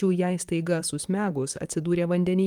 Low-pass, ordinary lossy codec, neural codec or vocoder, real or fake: 19.8 kHz; Opus, 32 kbps; vocoder, 44.1 kHz, 128 mel bands, Pupu-Vocoder; fake